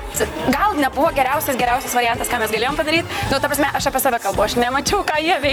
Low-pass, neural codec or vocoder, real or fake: 19.8 kHz; vocoder, 44.1 kHz, 128 mel bands, Pupu-Vocoder; fake